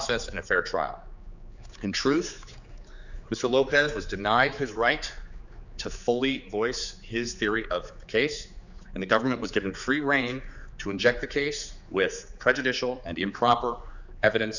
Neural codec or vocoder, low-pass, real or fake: codec, 16 kHz, 4 kbps, X-Codec, HuBERT features, trained on general audio; 7.2 kHz; fake